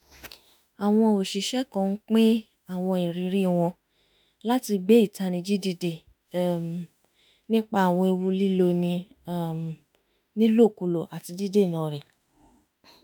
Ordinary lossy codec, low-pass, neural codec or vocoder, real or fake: none; none; autoencoder, 48 kHz, 32 numbers a frame, DAC-VAE, trained on Japanese speech; fake